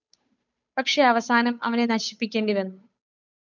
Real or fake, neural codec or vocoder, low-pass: fake; codec, 16 kHz, 8 kbps, FunCodec, trained on Chinese and English, 25 frames a second; 7.2 kHz